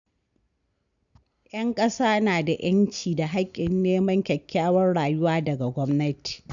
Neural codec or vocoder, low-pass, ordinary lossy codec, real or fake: none; 7.2 kHz; none; real